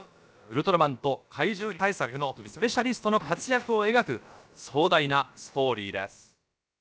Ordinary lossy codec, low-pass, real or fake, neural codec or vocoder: none; none; fake; codec, 16 kHz, about 1 kbps, DyCAST, with the encoder's durations